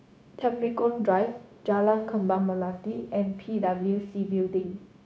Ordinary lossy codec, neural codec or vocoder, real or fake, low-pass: none; codec, 16 kHz, 0.9 kbps, LongCat-Audio-Codec; fake; none